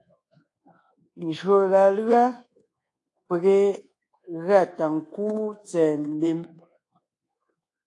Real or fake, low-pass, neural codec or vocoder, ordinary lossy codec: fake; 10.8 kHz; codec, 24 kHz, 1.2 kbps, DualCodec; AAC, 48 kbps